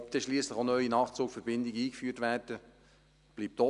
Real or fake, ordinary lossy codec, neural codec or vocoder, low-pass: real; none; none; 10.8 kHz